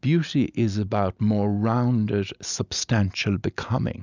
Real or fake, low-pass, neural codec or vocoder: real; 7.2 kHz; none